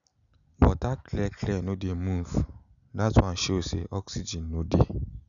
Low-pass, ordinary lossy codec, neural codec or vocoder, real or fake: 7.2 kHz; none; none; real